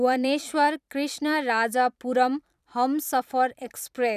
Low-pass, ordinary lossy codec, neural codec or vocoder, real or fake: 14.4 kHz; none; vocoder, 44.1 kHz, 128 mel bands every 512 samples, BigVGAN v2; fake